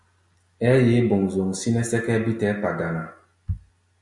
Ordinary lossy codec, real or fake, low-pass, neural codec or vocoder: MP3, 96 kbps; real; 10.8 kHz; none